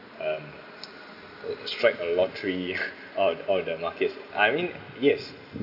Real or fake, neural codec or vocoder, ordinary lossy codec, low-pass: real; none; AAC, 48 kbps; 5.4 kHz